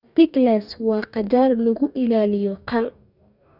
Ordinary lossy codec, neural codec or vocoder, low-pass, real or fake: none; codec, 44.1 kHz, 2.6 kbps, DAC; 5.4 kHz; fake